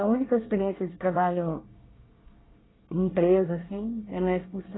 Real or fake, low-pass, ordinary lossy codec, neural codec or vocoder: fake; 7.2 kHz; AAC, 16 kbps; codec, 24 kHz, 1 kbps, SNAC